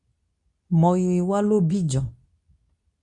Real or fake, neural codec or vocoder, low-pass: fake; codec, 24 kHz, 0.9 kbps, WavTokenizer, medium speech release version 2; 10.8 kHz